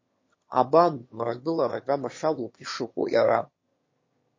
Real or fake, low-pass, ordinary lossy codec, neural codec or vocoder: fake; 7.2 kHz; MP3, 32 kbps; autoencoder, 22.05 kHz, a latent of 192 numbers a frame, VITS, trained on one speaker